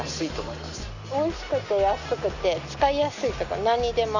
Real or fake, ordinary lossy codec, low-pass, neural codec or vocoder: real; none; 7.2 kHz; none